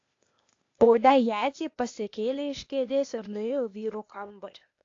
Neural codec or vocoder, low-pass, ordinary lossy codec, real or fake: codec, 16 kHz, 0.8 kbps, ZipCodec; 7.2 kHz; AAC, 48 kbps; fake